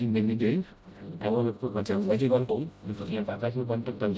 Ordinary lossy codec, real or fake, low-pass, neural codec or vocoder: none; fake; none; codec, 16 kHz, 0.5 kbps, FreqCodec, smaller model